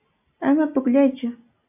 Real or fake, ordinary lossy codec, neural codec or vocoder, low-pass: real; AAC, 32 kbps; none; 3.6 kHz